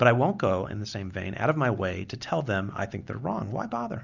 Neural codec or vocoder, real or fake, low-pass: none; real; 7.2 kHz